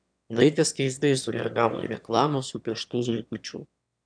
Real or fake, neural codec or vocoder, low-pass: fake; autoencoder, 22.05 kHz, a latent of 192 numbers a frame, VITS, trained on one speaker; 9.9 kHz